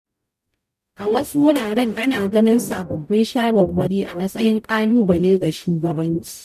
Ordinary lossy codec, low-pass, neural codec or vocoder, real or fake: none; 14.4 kHz; codec, 44.1 kHz, 0.9 kbps, DAC; fake